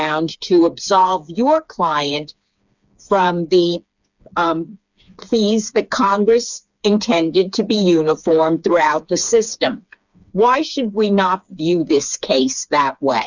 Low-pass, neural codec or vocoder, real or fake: 7.2 kHz; codec, 16 kHz, 4 kbps, FreqCodec, smaller model; fake